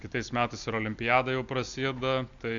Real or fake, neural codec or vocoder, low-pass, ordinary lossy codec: real; none; 7.2 kHz; AAC, 64 kbps